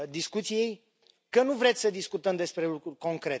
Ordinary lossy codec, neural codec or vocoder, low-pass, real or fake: none; none; none; real